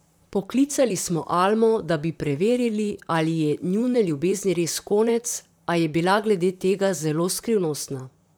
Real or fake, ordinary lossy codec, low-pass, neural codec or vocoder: fake; none; none; vocoder, 44.1 kHz, 128 mel bands, Pupu-Vocoder